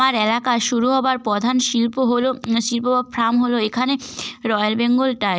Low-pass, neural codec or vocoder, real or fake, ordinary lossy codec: none; none; real; none